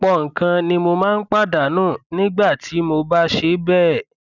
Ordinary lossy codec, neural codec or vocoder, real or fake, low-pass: none; none; real; 7.2 kHz